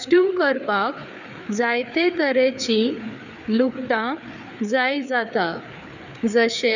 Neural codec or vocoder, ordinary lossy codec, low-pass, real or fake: codec, 16 kHz, 4 kbps, FreqCodec, larger model; none; 7.2 kHz; fake